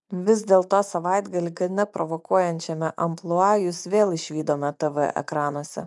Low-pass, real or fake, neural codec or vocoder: 10.8 kHz; fake; vocoder, 24 kHz, 100 mel bands, Vocos